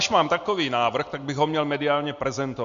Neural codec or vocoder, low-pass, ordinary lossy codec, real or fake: none; 7.2 kHz; MP3, 48 kbps; real